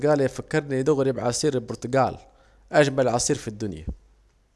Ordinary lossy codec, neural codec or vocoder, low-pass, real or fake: none; none; none; real